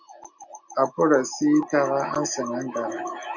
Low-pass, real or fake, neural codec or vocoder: 7.2 kHz; real; none